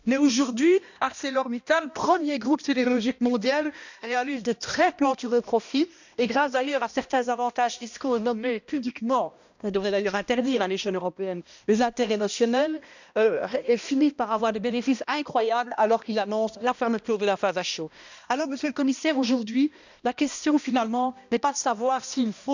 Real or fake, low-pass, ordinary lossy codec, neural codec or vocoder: fake; 7.2 kHz; none; codec, 16 kHz, 1 kbps, X-Codec, HuBERT features, trained on balanced general audio